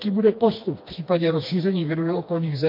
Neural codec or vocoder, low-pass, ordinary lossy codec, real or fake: codec, 16 kHz, 2 kbps, FreqCodec, smaller model; 5.4 kHz; MP3, 32 kbps; fake